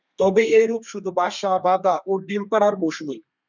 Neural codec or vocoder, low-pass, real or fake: codec, 32 kHz, 1.9 kbps, SNAC; 7.2 kHz; fake